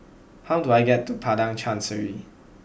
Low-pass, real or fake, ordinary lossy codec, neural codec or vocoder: none; real; none; none